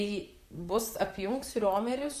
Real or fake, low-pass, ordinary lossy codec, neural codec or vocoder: fake; 14.4 kHz; AAC, 96 kbps; vocoder, 44.1 kHz, 128 mel bands, Pupu-Vocoder